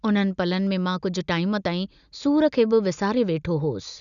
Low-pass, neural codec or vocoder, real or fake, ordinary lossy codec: 7.2 kHz; none; real; none